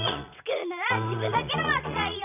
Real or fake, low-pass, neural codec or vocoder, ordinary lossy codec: fake; 3.6 kHz; vocoder, 22.05 kHz, 80 mel bands, Vocos; none